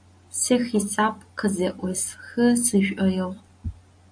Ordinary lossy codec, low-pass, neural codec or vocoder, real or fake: AAC, 64 kbps; 9.9 kHz; none; real